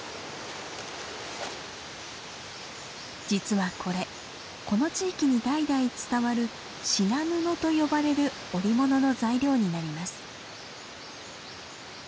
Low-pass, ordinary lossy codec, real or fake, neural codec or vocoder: none; none; real; none